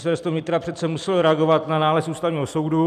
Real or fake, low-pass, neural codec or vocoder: fake; 14.4 kHz; vocoder, 44.1 kHz, 128 mel bands every 256 samples, BigVGAN v2